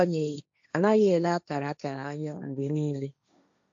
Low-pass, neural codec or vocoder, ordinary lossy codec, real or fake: 7.2 kHz; codec, 16 kHz, 1.1 kbps, Voila-Tokenizer; AAC, 64 kbps; fake